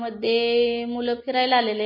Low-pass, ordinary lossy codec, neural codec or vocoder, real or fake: 5.4 kHz; MP3, 24 kbps; none; real